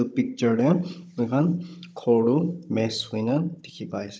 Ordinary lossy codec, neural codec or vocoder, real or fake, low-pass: none; codec, 16 kHz, 16 kbps, FunCodec, trained on Chinese and English, 50 frames a second; fake; none